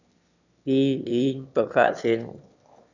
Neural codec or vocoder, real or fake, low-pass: autoencoder, 22.05 kHz, a latent of 192 numbers a frame, VITS, trained on one speaker; fake; 7.2 kHz